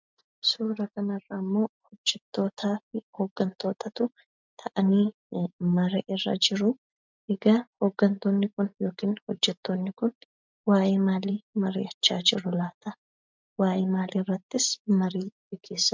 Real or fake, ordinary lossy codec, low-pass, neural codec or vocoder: real; MP3, 64 kbps; 7.2 kHz; none